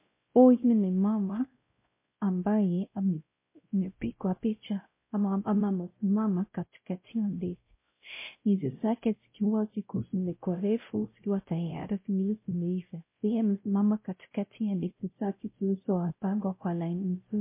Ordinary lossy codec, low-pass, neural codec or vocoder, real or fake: MP3, 24 kbps; 3.6 kHz; codec, 16 kHz, 0.5 kbps, X-Codec, WavLM features, trained on Multilingual LibriSpeech; fake